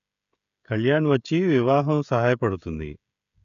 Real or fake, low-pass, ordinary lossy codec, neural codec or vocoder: fake; 7.2 kHz; none; codec, 16 kHz, 16 kbps, FreqCodec, smaller model